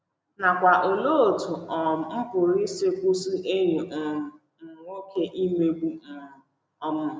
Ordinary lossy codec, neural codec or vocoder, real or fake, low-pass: none; none; real; none